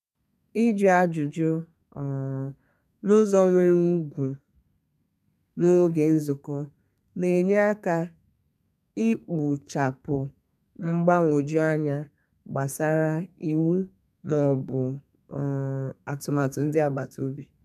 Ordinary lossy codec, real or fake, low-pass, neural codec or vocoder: none; fake; 14.4 kHz; codec, 32 kHz, 1.9 kbps, SNAC